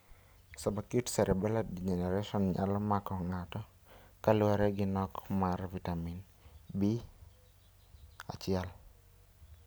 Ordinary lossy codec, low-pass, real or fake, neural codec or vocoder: none; none; real; none